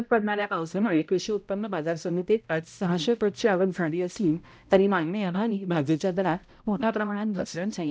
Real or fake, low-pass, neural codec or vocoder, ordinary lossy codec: fake; none; codec, 16 kHz, 0.5 kbps, X-Codec, HuBERT features, trained on balanced general audio; none